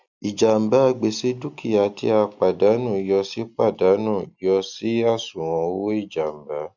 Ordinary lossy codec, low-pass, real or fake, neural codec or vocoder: none; 7.2 kHz; real; none